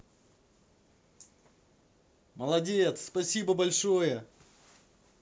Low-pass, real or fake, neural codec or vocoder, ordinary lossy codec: none; real; none; none